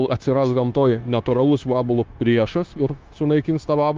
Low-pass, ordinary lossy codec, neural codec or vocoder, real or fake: 7.2 kHz; Opus, 32 kbps; codec, 16 kHz, 0.9 kbps, LongCat-Audio-Codec; fake